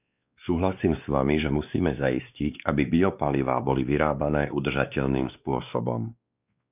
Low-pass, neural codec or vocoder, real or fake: 3.6 kHz; codec, 16 kHz, 2 kbps, X-Codec, WavLM features, trained on Multilingual LibriSpeech; fake